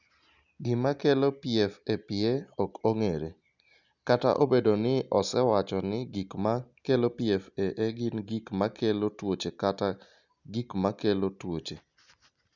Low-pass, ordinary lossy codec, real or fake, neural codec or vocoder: 7.2 kHz; none; real; none